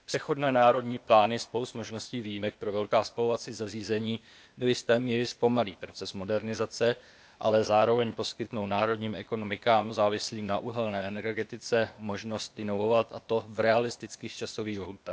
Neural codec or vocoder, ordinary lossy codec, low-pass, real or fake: codec, 16 kHz, 0.8 kbps, ZipCodec; none; none; fake